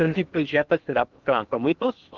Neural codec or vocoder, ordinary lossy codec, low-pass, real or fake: codec, 16 kHz in and 24 kHz out, 0.8 kbps, FocalCodec, streaming, 65536 codes; Opus, 24 kbps; 7.2 kHz; fake